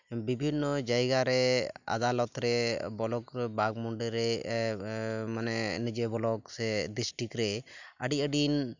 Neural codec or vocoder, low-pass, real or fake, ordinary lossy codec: none; 7.2 kHz; real; none